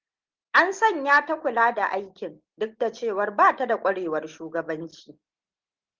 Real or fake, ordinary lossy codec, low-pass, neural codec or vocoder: real; Opus, 16 kbps; 7.2 kHz; none